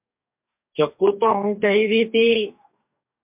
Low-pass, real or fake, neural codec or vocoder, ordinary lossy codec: 3.6 kHz; fake; codec, 44.1 kHz, 2.6 kbps, DAC; MP3, 32 kbps